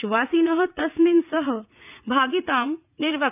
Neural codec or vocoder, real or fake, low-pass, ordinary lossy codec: vocoder, 44.1 kHz, 80 mel bands, Vocos; fake; 3.6 kHz; none